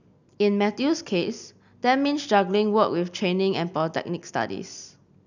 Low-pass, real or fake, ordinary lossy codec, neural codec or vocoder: 7.2 kHz; real; none; none